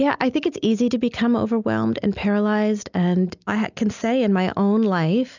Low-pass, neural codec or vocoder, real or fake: 7.2 kHz; none; real